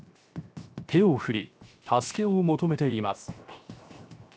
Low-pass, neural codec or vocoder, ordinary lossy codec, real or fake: none; codec, 16 kHz, 0.7 kbps, FocalCodec; none; fake